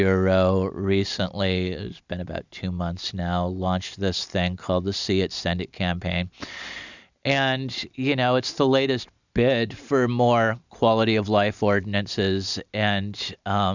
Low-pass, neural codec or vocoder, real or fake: 7.2 kHz; none; real